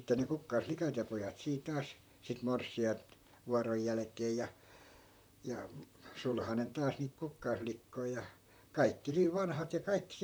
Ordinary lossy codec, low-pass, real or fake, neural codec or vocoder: none; none; fake; vocoder, 44.1 kHz, 128 mel bands, Pupu-Vocoder